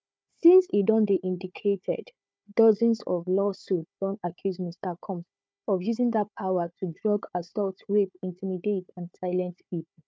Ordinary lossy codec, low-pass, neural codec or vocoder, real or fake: none; none; codec, 16 kHz, 4 kbps, FunCodec, trained on Chinese and English, 50 frames a second; fake